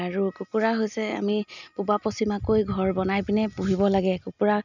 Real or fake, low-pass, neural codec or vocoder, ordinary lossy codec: real; 7.2 kHz; none; none